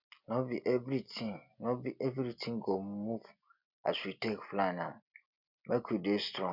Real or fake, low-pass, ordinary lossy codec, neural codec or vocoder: real; 5.4 kHz; none; none